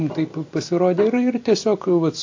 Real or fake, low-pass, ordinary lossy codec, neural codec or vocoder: real; 7.2 kHz; AAC, 48 kbps; none